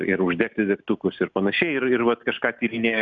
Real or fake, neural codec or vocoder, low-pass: real; none; 7.2 kHz